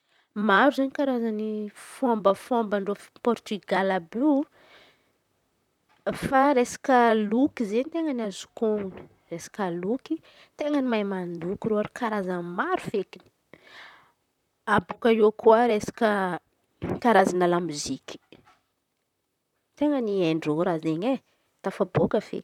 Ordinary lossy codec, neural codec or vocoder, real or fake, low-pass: none; vocoder, 44.1 kHz, 128 mel bands, Pupu-Vocoder; fake; 19.8 kHz